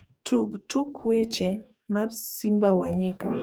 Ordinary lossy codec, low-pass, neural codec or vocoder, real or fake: none; none; codec, 44.1 kHz, 2.6 kbps, DAC; fake